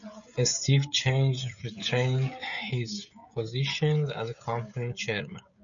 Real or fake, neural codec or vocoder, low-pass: fake; codec, 16 kHz, 16 kbps, FreqCodec, smaller model; 7.2 kHz